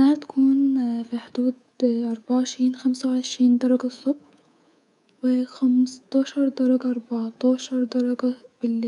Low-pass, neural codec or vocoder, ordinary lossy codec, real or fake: 10.8 kHz; codec, 24 kHz, 3.1 kbps, DualCodec; none; fake